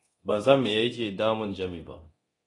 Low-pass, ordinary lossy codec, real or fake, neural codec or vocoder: 10.8 kHz; AAC, 32 kbps; fake; codec, 24 kHz, 0.9 kbps, DualCodec